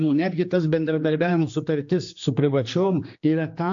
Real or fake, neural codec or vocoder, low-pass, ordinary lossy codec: fake; codec, 16 kHz, 2 kbps, X-Codec, HuBERT features, trained on general audio; 7.2 kHz; AAC, 64 kbps